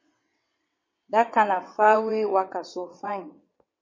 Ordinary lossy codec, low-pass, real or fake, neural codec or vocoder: MP3, 32 kbps; 7.2 kHz; fake; vocoder, 22.05 kHz, 80 mel bands, WaveNeXt